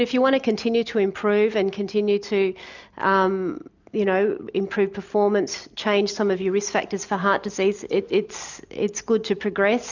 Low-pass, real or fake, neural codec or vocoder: 7.2 kHz; real; none